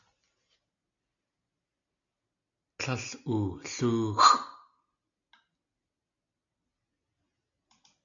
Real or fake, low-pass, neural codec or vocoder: real; 7.2 kHz; none